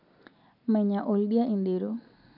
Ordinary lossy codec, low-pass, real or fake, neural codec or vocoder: none; 5.4 kHz; real; none